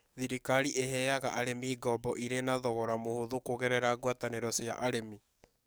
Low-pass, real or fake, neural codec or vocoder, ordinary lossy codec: none; fake; codec, 44.1 kHz, 7.8 kbps, DAC; none